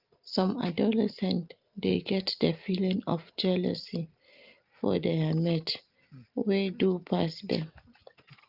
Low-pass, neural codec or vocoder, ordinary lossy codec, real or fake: 5.4 kHz; none; Opus, 32 kbps; real